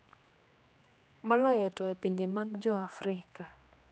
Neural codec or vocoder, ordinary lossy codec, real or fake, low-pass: codec, 16 kHz, 2 kbps, X-Codec, HuBERT features, trained on general audio; none; fake; none